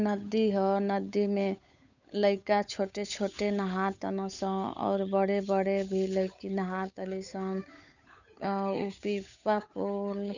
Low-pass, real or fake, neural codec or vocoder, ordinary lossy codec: 7.2 kHz; fake; codec, 16 kHz, 8 kbps, FunCodec, trained on Chinese and English, 25 frames a second; none